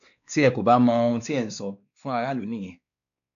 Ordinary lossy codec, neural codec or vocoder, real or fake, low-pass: none; codec, 16 kHz, 2 kbps, X-Codec, WavLM features, trained on Multilingual LibriSpeech; fake; 7.2 kHz